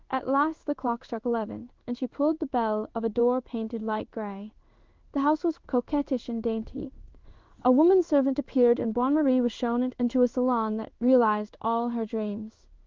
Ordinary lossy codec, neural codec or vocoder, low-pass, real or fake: Opus, 32 kbps; codec, 16 kHz in and 24 kHz out, 1 kbps, XY-Tokenizer; 7.2 kHz; fake